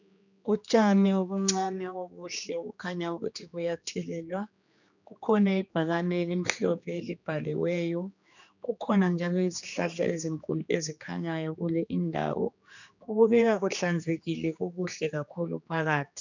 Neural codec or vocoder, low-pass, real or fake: codec, 16 kHz, 2 kbps, X-Codec, HuBERT features, trained on general audio; 7.2 kHz; fake